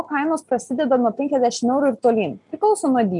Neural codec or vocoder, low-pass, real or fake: none; 10.8 kHz; real